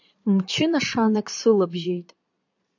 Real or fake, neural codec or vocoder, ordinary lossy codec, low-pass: fake; vocoder, 22.05 kHz, 80 mel bands, Vocos; AAC, 48 kbps; 7.2 kHz